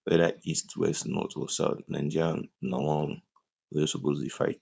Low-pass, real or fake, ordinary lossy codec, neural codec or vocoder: none; fake; none; codec, 16 kHz, 4.8 kbps, FACodec